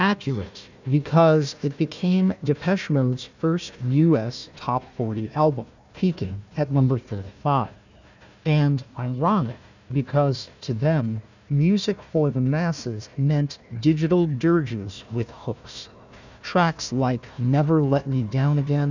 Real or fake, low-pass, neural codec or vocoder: fake; 7.2 kHz; codec, 16 kHz, 1 kbps, FunCodec, trained on Chinese and English, 50 frames a second